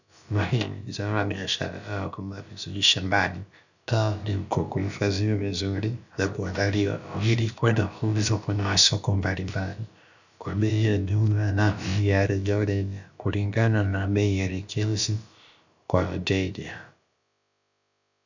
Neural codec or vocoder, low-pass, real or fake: codec, 16 kHz, about 1 kbps, DyCAST, with the encoder's durations; 7.2 kHz; fake